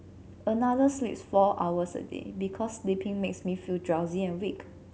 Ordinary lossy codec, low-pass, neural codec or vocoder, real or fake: none; none; none; real